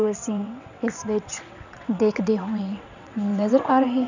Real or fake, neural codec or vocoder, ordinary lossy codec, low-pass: fake; vocoder, 22.05 kHz, 80 mel bands, WaveNeXt; none; 7.2 kHz